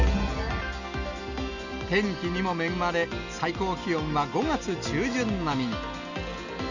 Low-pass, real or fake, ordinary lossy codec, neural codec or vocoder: 7.2 kHz; real; none; none